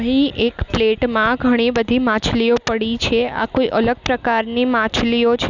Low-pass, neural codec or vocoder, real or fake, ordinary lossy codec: 7.2 kHz; none; real; none